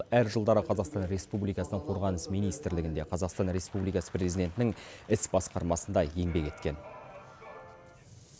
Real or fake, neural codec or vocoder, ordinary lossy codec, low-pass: real; none; none; none